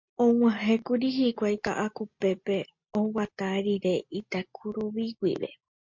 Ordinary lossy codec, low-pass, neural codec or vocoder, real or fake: MP3, 48 kbps; 7.2 kHz; none; real